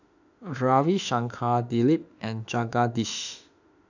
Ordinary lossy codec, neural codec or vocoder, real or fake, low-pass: none; autoencoder, 48 kHz, 32 numbers a frame, DAC-VAE, trained on Japanese speech; fake; 7.2 kHz